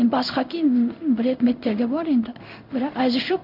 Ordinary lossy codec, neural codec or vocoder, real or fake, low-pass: none; codec, 16 kHz in and 24 kHz out, 1 kbps, XY-Tokenizer; fake; 5.4 kHz